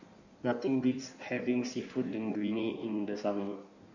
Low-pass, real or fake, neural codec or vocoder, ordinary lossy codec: 7.2 kHz; fake; codec, 16 kHz in and 24 kHz out, 1.1 kbps, FireRedTTS-2 codec; none